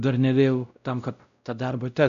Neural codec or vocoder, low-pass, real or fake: codec, 16 kHz, 0.5 kbps, X-Codec, WavLM features, trained on Multilingual LibriSpeech; 7.2 kHz; fake